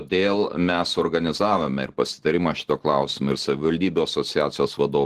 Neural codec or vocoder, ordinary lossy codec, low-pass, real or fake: none; Opus, 16 kbps; 14.4 kHz; real